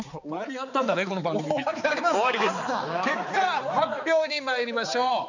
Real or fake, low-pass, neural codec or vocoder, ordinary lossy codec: fake; 7.2 kHz; codec, 16 kHz, 4 kbps, X-Codec, HuBERT features, trained on balanced general audio; none